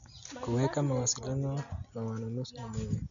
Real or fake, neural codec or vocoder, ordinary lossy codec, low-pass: real; none; none; 7.2 kHz